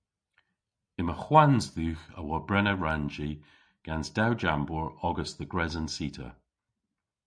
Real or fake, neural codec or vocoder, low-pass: real; none; 9.9 kHz